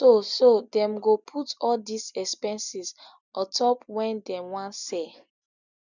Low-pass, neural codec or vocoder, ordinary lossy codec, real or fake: 7.2 kHz; none; none; real